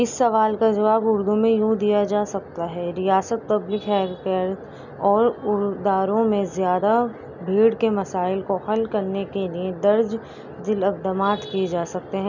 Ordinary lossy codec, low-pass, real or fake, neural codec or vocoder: none; 7.2 kHz; real; none